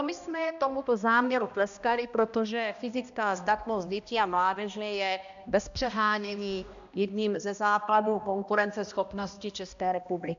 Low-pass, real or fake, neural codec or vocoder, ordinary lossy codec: 7.2 kHz; fake; codec, 16 kHz, 1 kbps, X-Codec, HuBERT features, trained on balanced general audio; AAC, 96 kbps